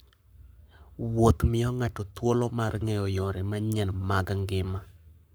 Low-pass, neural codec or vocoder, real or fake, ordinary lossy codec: none; codec, 44.1 kHz, 7.8 kbps, Pupu-Codec; fake; none